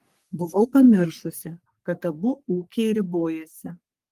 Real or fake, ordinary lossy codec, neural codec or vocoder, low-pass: fake; Opus, 24 kbps; codec, 44.1 kHz, 3.4 kbps, Pupu-Codec; 14.4 kHz